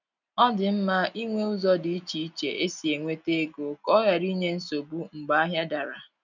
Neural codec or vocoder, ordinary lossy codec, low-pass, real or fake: none; none; 7.2 kHz; real